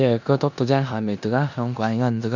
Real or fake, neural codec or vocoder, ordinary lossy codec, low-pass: fake; codec, 16 kHz in and 24 kHz out, 0.9 kbps, LongCat-Audio-Codec, four codebook decoder; none; 7.2 kHz